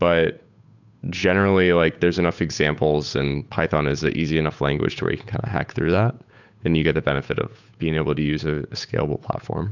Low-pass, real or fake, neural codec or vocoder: 7.2 kHz; fake; codec, 16 kHz, 8 kbps, FunCodec, trained on Chinese and English, 25 frames a second